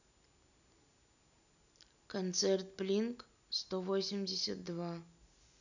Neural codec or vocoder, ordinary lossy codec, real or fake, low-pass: none; none; real; 7.2 kHz